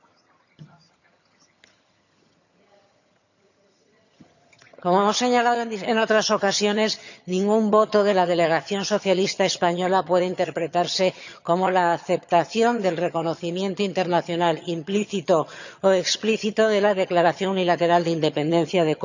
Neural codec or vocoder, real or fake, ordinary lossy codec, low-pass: vocoder, 22.05 kHz, 80 mel bands, HiFi-GAN; fake; none; 7.2 kHz